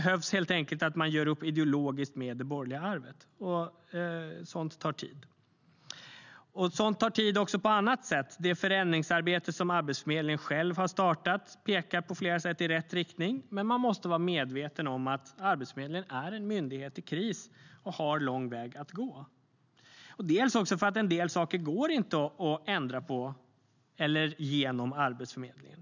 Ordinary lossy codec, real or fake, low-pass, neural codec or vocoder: none; real; 7.2 kHz; none